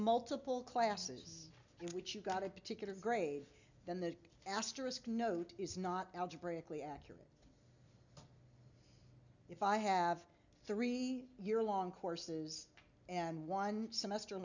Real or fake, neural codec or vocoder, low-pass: real; none; 7.2 kHz